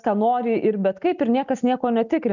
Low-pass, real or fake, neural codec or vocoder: 7.2 kHz; real; none